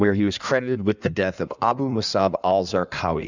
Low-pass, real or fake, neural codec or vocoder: 7.2 kHz; fake; codec, 16 kHz in and 24 kHz out, 1.1 kbps, FireRedTTS-2 codec